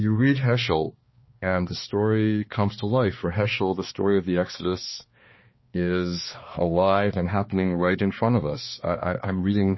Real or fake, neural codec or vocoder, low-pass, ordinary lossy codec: fake; codec, 16 kHz, 2 kbps, X-Codec, HuBERT features, trained on balanced general audio; 7.2 kHz; MP3, 24 kbps